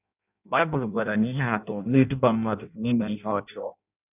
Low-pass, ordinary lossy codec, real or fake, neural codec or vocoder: 3.6 kHz; none; fake; codec, 16 kHz in and 24 kHz out, 0.6 kbps, FireRedTTS-2 codec